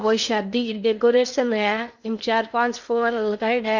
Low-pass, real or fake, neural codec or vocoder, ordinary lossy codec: 7.2 kHz; fake; codec, 16 kHz in and 24 kHz out, 0.8 kbps, FocalCodec, streaming, 65536 codes; none